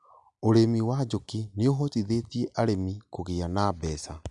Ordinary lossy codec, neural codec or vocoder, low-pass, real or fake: MP3, 96 kbps; none; 10.8 kHz; real